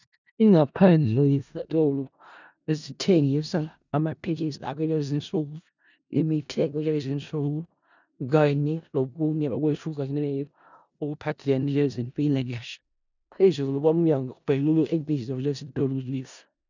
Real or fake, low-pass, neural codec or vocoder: fake; 7.2 kHz; codec, 16 kHz in and 24 kHz out, 0.4 kbps, LongCat-Audio-Codec, four codebook decoder